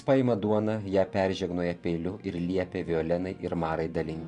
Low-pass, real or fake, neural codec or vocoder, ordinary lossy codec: 10.8 kHz; fake; vocoder, 44.1 kHz, 128 mel bands every 512 samples, BigVGAN v2; MP3, 96 kbps